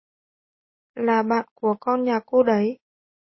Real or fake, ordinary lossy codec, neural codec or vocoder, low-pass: real; MP3, 24 kbps; none; 7.2 kHz